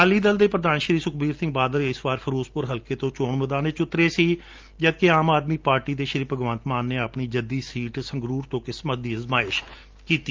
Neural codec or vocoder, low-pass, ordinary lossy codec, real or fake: none; 7.2 kHz; Opus, 24 kbps; real